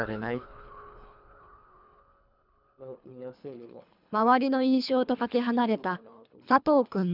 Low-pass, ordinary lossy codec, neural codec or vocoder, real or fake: 5.4 kHz; none; codec, 24 kHz, 3 kbps, HILCodec; fake